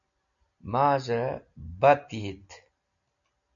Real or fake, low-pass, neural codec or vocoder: real; 7.2 kHz; none